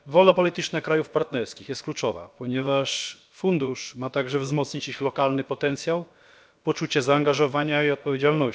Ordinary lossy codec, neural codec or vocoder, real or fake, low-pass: none; codec, 16 kHz, about 1 kbps, DyCAST, with the encoder's durations; fake; none